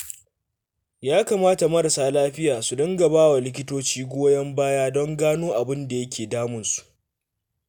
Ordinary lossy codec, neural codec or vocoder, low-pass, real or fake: none; none; none; real